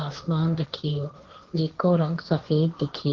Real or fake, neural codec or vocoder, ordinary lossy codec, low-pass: fake; codec, 16 kHz, 1.1 kbps, Voila-Tokenizer; Opus, 16 kbps; 7.2 kHz